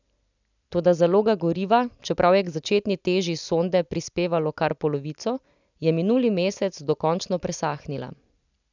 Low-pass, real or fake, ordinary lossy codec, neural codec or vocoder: 7.2 kHz; real; none; none